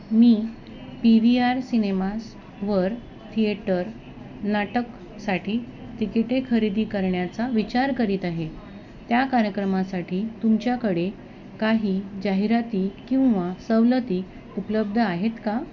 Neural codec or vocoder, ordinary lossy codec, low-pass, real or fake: none; none; 7.2 kHz; real